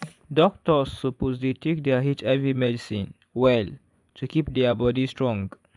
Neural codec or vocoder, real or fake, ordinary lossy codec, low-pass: vocoder, 24 kHz, 100 mel bands, Vocos; fake; none; 10.8 kHz